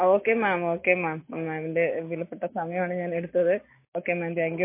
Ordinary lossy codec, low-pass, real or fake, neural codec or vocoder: MP3, 24 kbps; 3.6 kHz; real; none